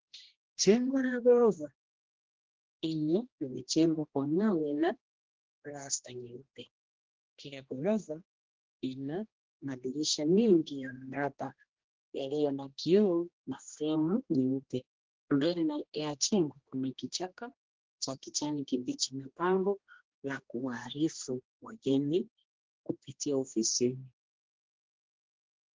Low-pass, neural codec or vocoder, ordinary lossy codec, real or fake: 7.2 kHz; codec, 16 kHz, 1 kbps, X-Codec, HuBERT features, trained on general audio; Opus, 16 kbps; fake